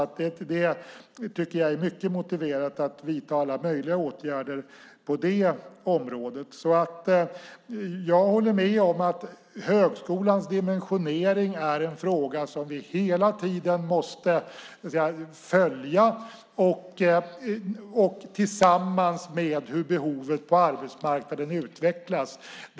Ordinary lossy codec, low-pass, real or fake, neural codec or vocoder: none; none; real; none